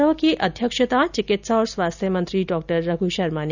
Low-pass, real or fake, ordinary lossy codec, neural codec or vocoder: 7.2 kHz; real; none; none